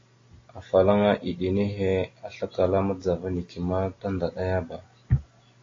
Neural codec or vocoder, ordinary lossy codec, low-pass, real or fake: none; AAC, 32 kbps; 7.2 kHz; real